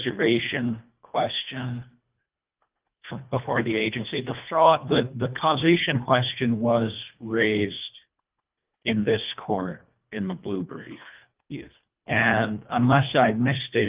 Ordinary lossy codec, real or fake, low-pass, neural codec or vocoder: Opus, 24 kbps; fake; 3.6 kHz; codec, 24 kHz, 1.5 kbps, HILCodec